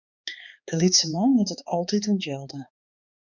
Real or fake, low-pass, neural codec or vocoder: fake; 7.2 kHz; codec, 16 kHz, 4 kbps, X-Codec, HuBERT features, trained on balanced general audio